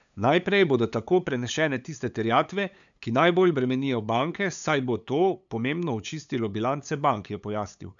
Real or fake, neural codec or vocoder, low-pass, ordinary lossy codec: fake; codec, 16 kHz, 8 kbps, FunCodec, trained on LibriTTS, 25 frames a second; 7.2 kHz; none